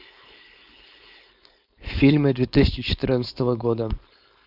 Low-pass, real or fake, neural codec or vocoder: 5.4 kHz; fake; codec, 16 kHz, 4.8 kbps, FACodec